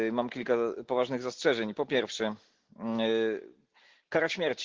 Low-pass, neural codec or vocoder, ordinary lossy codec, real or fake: 7.2 kHz; none; Opus, 16 kbps; real